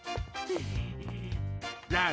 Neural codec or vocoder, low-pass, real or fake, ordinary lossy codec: none; none; real; none